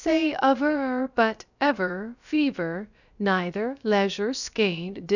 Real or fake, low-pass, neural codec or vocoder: fake; 7.2 kHz; codec, 16 kHz, about 1 kbps, DyCAST, with the encoder's durations